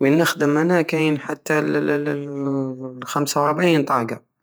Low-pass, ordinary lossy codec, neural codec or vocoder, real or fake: none; none; vocoder, 48 kHz, 128 mel bands, Vocos; fake